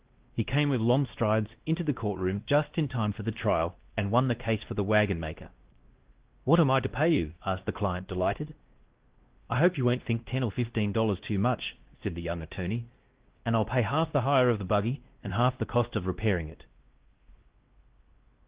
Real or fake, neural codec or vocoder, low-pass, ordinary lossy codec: fake; codec, 16 kHz, 1 kbps, X-Codec, WavLM features, trained on Multilingual LibriSpeech; 3.6 kHz; Opus, 16 kbps